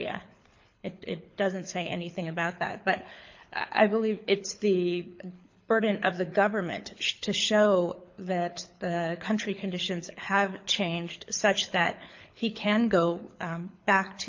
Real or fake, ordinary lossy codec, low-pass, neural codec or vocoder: fake; MP3, 48 kbps; 7.2 kHz; codec, 24 kHz, 6 kbps, HILCodec